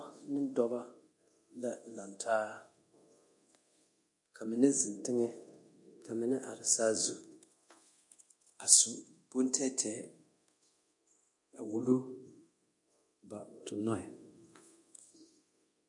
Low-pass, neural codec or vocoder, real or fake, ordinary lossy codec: 10.8 kHz; codec, 24 kHz, 0.9 kbps, DualCodec; fake; MP3, 48 kbps